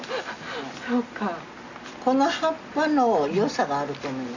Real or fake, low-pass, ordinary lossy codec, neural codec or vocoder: real; 7.2 kHz; none; none